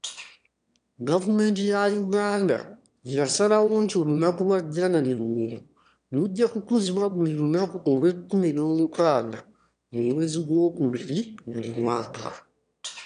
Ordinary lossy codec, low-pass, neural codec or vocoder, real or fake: none; 9.9 kHz; autoencoder, 22.05 kHz, a latent of 192 numbers a frame, VITS, trained on one speaker; fake